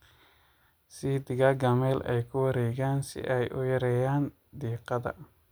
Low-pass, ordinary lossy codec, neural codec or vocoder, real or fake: none; none; none; real